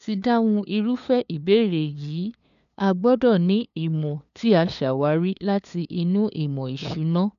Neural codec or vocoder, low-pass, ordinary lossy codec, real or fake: codec, 16 kHz, 2 kbps, FunCodec, trained on LibriTTS, 25 frames a second; 7.2 kHz; none; fake